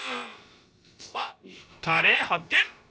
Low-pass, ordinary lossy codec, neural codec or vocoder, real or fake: none; none; codec, 16 kHz, about 1 kbps, DyCAST, with the encoder's durations; fake